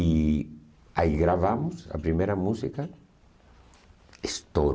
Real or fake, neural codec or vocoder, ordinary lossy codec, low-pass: real; none; none; none